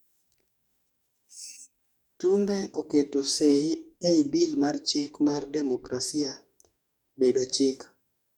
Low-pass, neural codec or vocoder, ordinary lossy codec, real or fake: 19.8 kHz; codec, 44.1 kHz, 2.6 kbps, DAC; none; fake